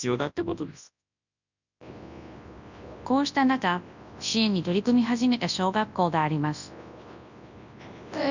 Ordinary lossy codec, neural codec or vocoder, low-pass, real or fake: none; codec, 24 kHz, 0.9 kbps, WavTokenizer, large speech release; 7.2 kHz; fake